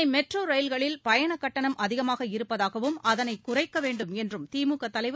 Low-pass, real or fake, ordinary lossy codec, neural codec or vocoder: none; real; none; none